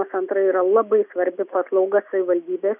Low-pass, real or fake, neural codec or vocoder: 3.6 kHz; real; none